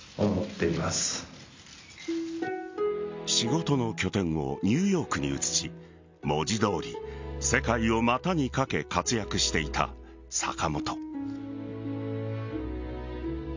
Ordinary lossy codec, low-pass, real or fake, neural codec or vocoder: MP3, 64 kbps; 7.2 kHz; real; none